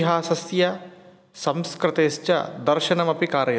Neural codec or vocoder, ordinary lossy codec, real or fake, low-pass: none; none; real; none